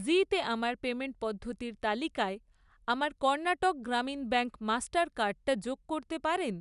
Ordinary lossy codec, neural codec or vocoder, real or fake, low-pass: none; none; real; 10.8 kHz